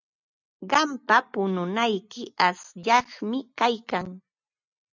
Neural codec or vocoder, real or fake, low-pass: none; real; 7.2 kHz